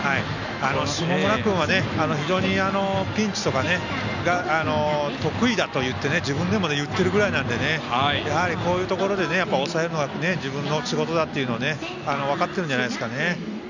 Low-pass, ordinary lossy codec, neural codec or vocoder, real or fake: 7.2 kHz; none; none; real